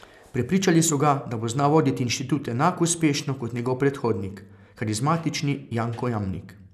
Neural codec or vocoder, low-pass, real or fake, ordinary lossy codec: none; 14.4 kHz; real; none